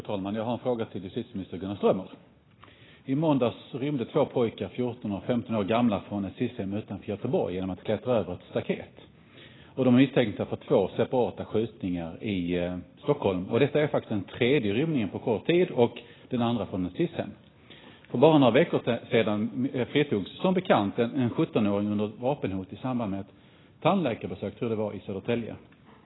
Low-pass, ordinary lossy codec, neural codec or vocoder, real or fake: 7.2 kHz; AAC, 16 kbps; none; real